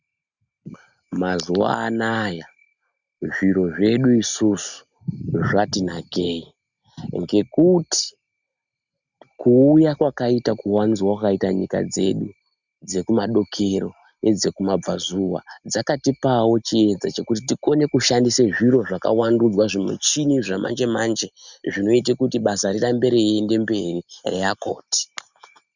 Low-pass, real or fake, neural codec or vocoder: 7.2 kHz; real; none